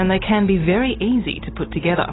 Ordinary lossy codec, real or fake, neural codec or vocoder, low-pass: AAC, 16 kbps; real; none; 7.2 kHz